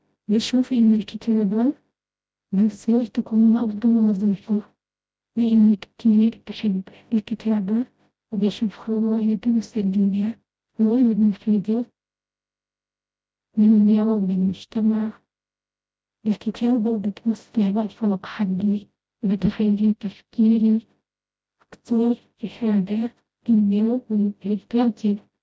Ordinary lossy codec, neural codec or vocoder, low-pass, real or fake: none; codec, 16 kHz, 0.5 kbps, FreqCodec, smaller model; none; fake